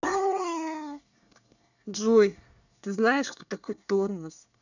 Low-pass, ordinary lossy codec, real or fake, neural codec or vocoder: 7.2 kHz; none; fake; codec, 24 kHz, 1 kbps, SNAC